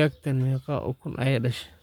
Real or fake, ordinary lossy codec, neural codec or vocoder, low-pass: fake; none; codec, 44.1 kHz, 7.8 kbps, Pupu-Codec; 19.8 kHz